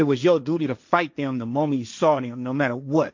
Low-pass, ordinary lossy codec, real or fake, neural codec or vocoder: 7.2 kHz; MP3, 64 kbps; fake; codec, 16 kHz, 1.1 kbps, Voila-Tokenizer